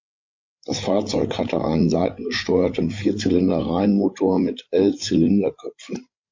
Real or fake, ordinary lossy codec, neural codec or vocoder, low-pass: fake; MP3, 48 kbps; codec, 16 kHz, 8 kbps, FreqCodec, larger model; 7.2 kHz